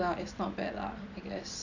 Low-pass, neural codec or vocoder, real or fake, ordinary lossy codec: 7.2 kHz; vocoder, 44.1 kHz, 128 mel bands every 256 samples, BigVGAN v2; fake; none